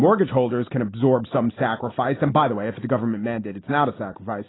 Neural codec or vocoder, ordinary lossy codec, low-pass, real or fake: none; AAC, 16 kbps; 7.2 kHz; real